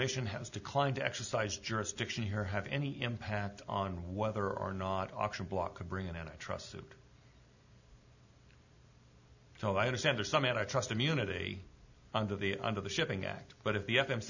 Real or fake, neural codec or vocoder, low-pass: real; none; 7.2 kHz